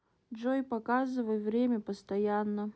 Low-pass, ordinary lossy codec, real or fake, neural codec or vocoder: none; none; real; none